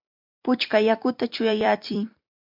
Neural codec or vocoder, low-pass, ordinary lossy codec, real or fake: vocoder, 44.1 kHz, 128 mel bands every 256 samples, BigVGAN v2; 5.4 kHz; MP3, 48 kbps; fake